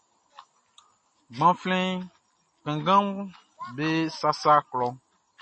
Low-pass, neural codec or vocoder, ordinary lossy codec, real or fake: 9.9 kHz; none; MP3, 32 kbps; real